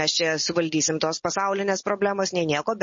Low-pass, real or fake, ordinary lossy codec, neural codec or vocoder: 7.2 kHz; real; MP3, 32 kbps; none